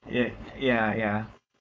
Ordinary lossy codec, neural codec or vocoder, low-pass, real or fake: none; codec, 16 kHz, 4.8 kbps, FACodec; none; fake